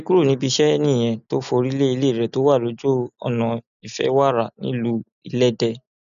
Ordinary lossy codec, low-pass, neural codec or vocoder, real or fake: none; 7.2 kHz; none; real